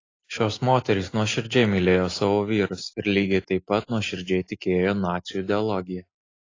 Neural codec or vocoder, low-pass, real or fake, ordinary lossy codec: none; 7.2 kHz; real; AAC, 32 kbps